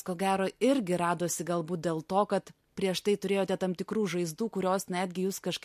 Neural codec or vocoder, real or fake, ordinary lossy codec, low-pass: none; real; MP3, 64 kbps; 14.4 kHz